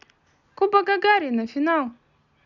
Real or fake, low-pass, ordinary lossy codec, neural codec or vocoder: real; 7.2 kHz; none; none